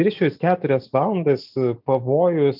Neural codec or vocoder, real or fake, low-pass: none; real; 5.4 kHz